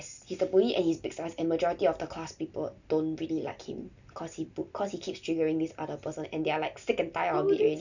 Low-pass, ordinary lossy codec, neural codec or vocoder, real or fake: 7.2 kHz; none; none; real